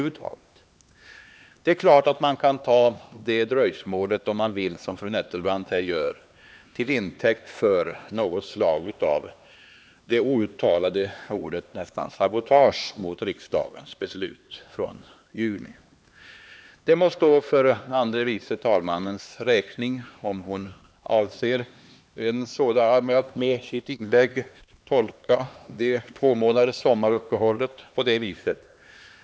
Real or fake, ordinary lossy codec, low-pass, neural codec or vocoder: fake; none; none; codec, 16 kHz, 2 kbps, X-Codec, HuBERT features, trained on LibriSpeech